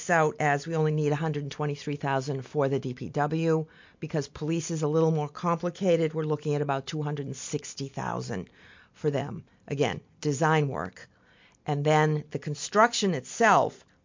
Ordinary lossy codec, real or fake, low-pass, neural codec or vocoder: MP3, 48 kbps; real; 7.2 kHz; none